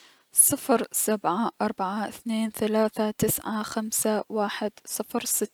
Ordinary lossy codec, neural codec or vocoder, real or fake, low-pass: none; none; real; none